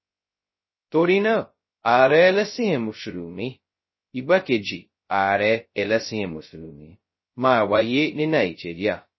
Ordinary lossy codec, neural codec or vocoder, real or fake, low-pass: MP3, 24 kbps; codec, 16 kHz, 0.2 kbps, FocalCodec; fake; 7.2 kHz